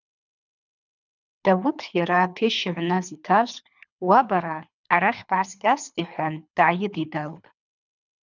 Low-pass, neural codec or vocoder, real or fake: 7.2 kHz; codec, 24 kHz, 3 kbps, HILCodec; fake